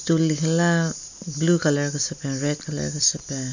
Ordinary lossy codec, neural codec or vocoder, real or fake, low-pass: none; none; real; 7.2 kHz